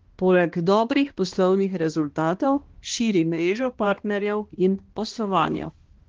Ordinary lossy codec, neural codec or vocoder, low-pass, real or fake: Opus, 16 kbps; codec, 16 kHz, 1 kbps, X-Codec, HuBERT features, trained on balanced general audio; 7.2 kHz; fake